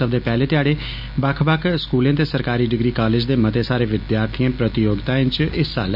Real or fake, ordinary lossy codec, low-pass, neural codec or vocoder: real; MP3, 48 kbps; 5.4 kHz; none